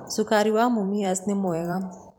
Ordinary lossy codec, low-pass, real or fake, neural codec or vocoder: none; none; fake; vocoder, 44.1 kHz, 128 mel bands every 512 samples, BigVGAN v2